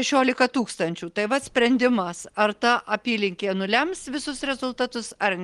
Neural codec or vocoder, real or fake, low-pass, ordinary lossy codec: none; real; 10.8 kHz; Opus, 24 kbps